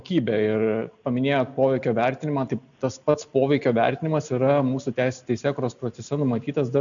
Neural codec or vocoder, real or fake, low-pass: none; real; 7.2 kHz